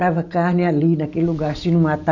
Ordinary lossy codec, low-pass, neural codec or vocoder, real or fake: none; 7.2 kHz; none; real